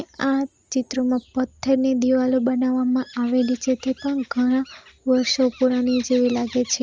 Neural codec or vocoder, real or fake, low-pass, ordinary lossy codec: none; real; 7.2 kHz; Opus, 24 kbps